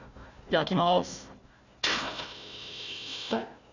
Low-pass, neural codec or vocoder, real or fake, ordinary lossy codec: 7.2 kHz; codec, 16 kHz, 1 kbps, FunCodec, trained on Chinese and English, 50 frames a second; fake; none